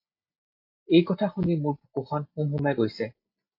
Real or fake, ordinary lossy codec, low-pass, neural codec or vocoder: real; MP3, 32 kbps; 5.4 kHz; none